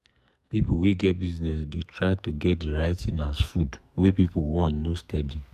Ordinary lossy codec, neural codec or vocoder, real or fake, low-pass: none; codec, 44.1 kHz, 2.6 kbps, SNAC; fake; 14.4 kHz